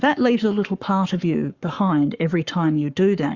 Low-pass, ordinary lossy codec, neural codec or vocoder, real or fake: 7.2 kHz; Opus, 64 kbps; codec, 44.1 kHz, 7.8 kbps, Pupu-Codec; fake